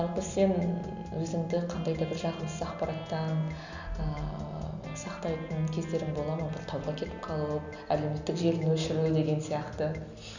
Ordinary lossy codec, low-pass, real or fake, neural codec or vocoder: none; 7.2 kHz; real; none